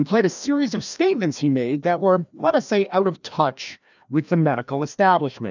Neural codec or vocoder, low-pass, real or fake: codec, 16 kHz, 1 kbps, FreqCodec, larger model; 7.2 kHz; fake